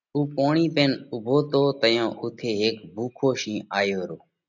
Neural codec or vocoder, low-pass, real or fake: none; 7.2 kHz; real